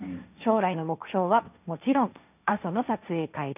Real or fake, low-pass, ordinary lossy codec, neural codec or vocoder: fake; 3.6 kHz; none; codec, 16 kHz, 1.1 kbps, Voila-Tokenizer